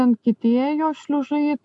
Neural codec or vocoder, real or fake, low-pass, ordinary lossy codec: none; real; 10.8 kHz; AAC, 64 kbps